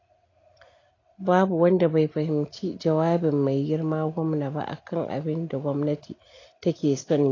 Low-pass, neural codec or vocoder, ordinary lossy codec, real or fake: 7.2 kHz; none; AAC, 32 kbps; real